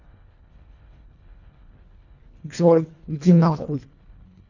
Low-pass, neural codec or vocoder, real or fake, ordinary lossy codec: 7.2 kHz; codec, 24 kHz, 1.5 kbps, HILCodec; fake; none